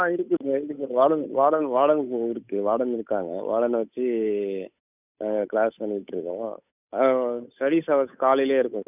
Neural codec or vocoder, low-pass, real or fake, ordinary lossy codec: codec, 16 kHz, 8 kbps, FunCodec, trained on Chinese and English, 25 frames a second; 3.6 kHz; fake; none